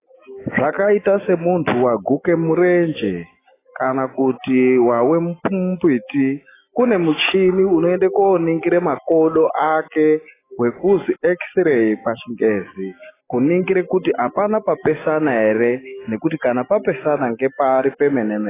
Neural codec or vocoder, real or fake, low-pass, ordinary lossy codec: none; real; 3.6 kHz; AAC, 16 kbps